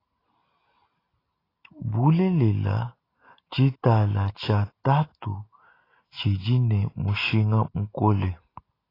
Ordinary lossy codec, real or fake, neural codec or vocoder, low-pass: AAC, 24 kbps; real; none; 5.4 kHz